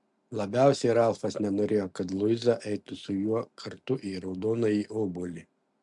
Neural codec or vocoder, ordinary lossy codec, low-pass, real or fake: none; MP3, 64 kbps; 10.8 kHz; real